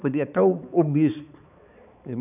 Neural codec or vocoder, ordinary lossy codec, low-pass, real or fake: codec, 16 kHz, 4 kbps, X-Codec, HuBERT features, trained on balanced general audio; none; 3.6 kHz; fake